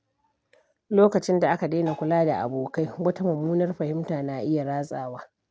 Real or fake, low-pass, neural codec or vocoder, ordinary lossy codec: real; none; none; none